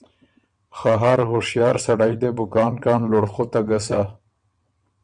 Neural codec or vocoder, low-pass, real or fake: vocoder, 22.05 kHz, 80 mel bands, WaveNeXt; 9.9 kHz; fake